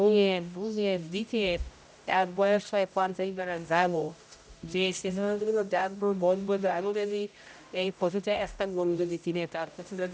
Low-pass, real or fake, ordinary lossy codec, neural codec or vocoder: none; fake; none; codec, 16 kHz, 0.5 kbps, X-Codec, HuBERT features, trained on general audio